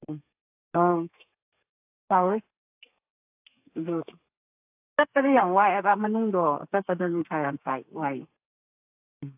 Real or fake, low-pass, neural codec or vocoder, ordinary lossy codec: fake; 3.6 kHz; codec, 32 kHz, 1.9 kbps, SNAC; AAC, 32 kbps